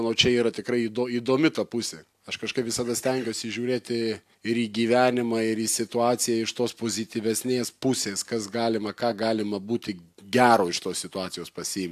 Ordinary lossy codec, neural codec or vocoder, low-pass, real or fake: AAC, 96 kbps; none; 14.4 kHz; real